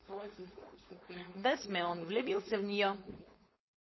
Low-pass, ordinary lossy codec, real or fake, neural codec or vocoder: 7.2 kHz; MP3, 24 kbps; fake; codec, 16 kHz, 4.8 kbps, FACodec